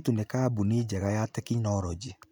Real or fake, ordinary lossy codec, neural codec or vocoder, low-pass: real; none; none; none